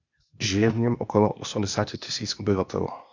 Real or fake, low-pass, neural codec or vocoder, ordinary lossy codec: fake; 7.2 kHz; codec, 16 kHz, 0.8 kbps, ZipCodec; Opus, 64 kbps